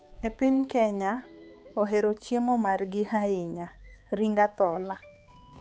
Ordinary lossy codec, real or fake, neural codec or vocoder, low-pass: none; fake; codec, 16 kHz, 4 kbps, X-Codec, HuBERT features, trained on balanced general audio; none